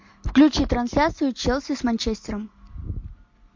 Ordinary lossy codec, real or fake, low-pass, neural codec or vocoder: MP3, 48 kbps; real; 7.2 kHz; none